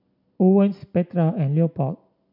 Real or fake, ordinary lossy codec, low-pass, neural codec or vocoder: real; none; 5.4 kHz; none